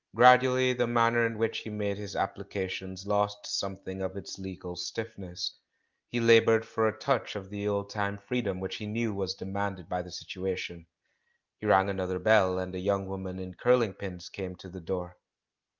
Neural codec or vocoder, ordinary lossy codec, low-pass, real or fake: none; Opus, 32 kbps; 7.2 kHz; real